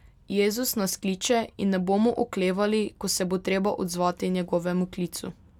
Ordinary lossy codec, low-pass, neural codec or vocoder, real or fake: none; 19.8 kHz; none; real